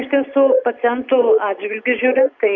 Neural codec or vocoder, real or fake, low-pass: none; real; 7.2 kHz